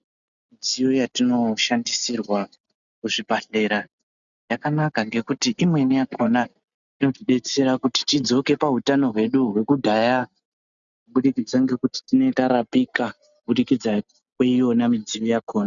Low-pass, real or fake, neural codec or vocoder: 7.2 kHz; real; none